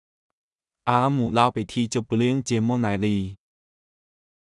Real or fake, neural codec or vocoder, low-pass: fake; codec, 16 kHz in and 24 kHz out, 0.4 kbps, LongCat-Audio-Codec, two codebook decoder; 10.8 kHz